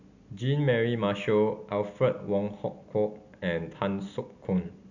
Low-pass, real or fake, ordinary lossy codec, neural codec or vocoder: 7.2 kHz; real; none; none